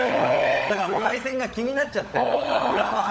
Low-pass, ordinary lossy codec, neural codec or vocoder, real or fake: none; none; codec, 16 kHz, 16 kbps, FunCodec, trained on LibriTTS, 50 frames a second; fake